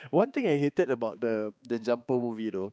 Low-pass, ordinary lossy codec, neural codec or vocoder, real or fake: none; none; codec, 16 kHz, 2 kbps, X-Codec, HuBERT features, trained on balanced general audio; fake